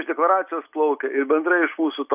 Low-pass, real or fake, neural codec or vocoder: 3.6 kHz; real; none